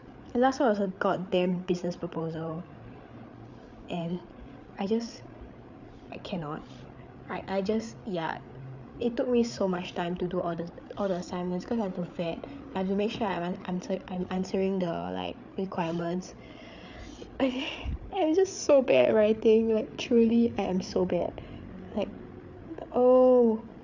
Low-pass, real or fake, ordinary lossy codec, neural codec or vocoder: 7.2 kHz; fake; none; codec, 16 kHz, 8 kbps, FreqCodec, larger model